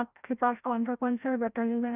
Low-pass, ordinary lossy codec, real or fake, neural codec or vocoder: 3.6 kHz; none; fake; codec, 16 kHz, 0.5 kbps, FreqCodec, larger model